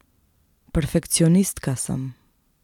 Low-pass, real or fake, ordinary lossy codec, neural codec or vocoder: 19.8 kHz; real; none; none